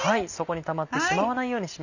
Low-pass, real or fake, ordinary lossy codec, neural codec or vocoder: 7.2 kHz; real; none; none